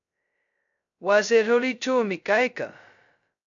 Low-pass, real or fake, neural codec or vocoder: 7.2 kHz; fake; codec, 16 kHz, 0.2 kbps, FocalCodec